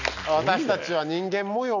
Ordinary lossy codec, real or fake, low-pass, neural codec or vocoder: none; real; 7.2 kHz; none